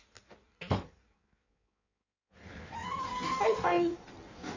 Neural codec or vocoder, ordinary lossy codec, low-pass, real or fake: codec, 16 kHz in and 24 kHz out, 1.1 kbps, FireRedTTS-2 codec; none; 7.2 kHz; fake